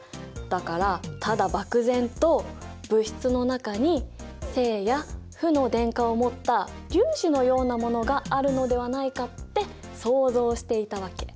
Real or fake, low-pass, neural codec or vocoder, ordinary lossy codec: real; none; none; none